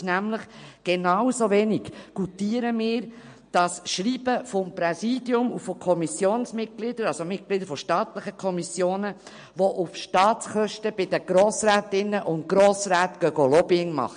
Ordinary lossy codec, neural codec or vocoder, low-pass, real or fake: MP3, 48 kbps; none; 9.9 kHz; real